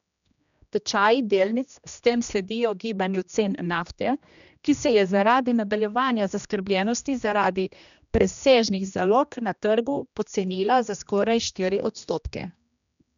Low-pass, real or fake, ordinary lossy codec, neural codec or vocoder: 7.2 kHz; fake; none; codec, 16 kHz, 1 kbps, X-Codec, HuBERT features, trained on general audio